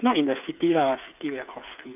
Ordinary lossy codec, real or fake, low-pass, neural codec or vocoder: AAC, 32 kbps; fake; 3.6 kHz; codec, 16 kHz, 8 kbps, FreqCodec, smaller model